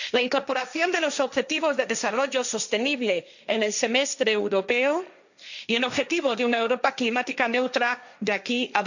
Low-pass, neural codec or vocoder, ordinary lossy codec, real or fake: 7.2 kHz; codec, 16 kHz, 1.1 kbps, Voila-Tokenizer; none; fake